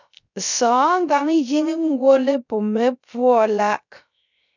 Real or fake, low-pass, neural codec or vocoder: fake; 7.2 kHz; codec, 16 kHz, 0.3 kbps, FocalCodec